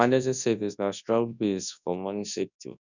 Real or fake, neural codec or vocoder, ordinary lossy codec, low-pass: fake; codec, 24 kHz, 0.9 kbps, WavTokenizer, large speech release; none; 7.2 kHz